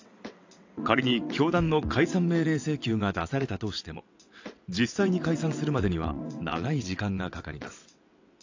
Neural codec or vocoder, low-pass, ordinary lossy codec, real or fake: vocoder, 22.05 kHz, 80 mel bands, WaveNeXt; 7.2 kHz; AAC, 48 kbps; fake